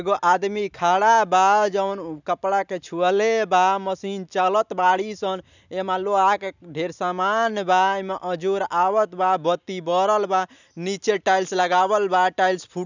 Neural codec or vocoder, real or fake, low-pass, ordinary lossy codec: none; real; 7.2 kHz; none